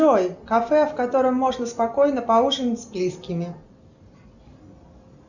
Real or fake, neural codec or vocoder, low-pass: real; none; 7.2 kHz